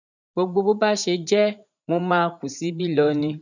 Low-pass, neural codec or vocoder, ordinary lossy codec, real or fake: 7.2 kHz; vocoder, 44.1 kHz, 80 mel bands, Vocos; none; fake